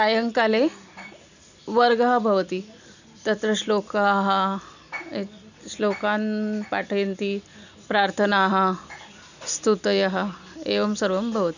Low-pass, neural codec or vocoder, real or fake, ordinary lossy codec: 7.2 kHz; none; real; none